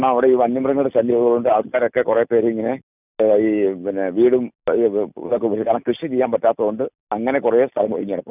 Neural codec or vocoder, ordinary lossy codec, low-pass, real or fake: none; none; 3.6 kHz; real